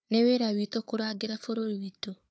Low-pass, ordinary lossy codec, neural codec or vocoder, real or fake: none; none; codec, 16 kHz, 4 kbps, FunCodec, trained on Chinese and English, 50 frames a second; fake